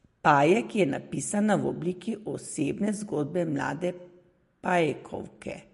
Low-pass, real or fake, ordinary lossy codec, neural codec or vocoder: 14.4 kHz; real; MP3, 48 kbps; none